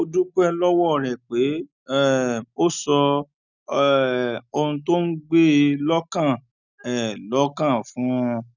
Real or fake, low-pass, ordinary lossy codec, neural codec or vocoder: real; 7.2 kHz; none; none